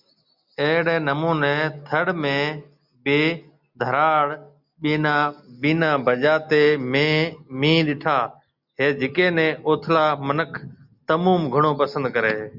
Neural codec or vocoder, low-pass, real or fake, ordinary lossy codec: none; 5.4 kHz; real; Opus, 64 kbps